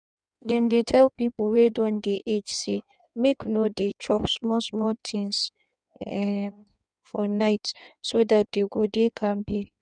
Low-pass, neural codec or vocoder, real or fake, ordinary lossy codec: 9.9 kHz; codec, 16 kHz in and 24 kHz out, 1.1 kbps, FireRedTTS-2 codec; fake; none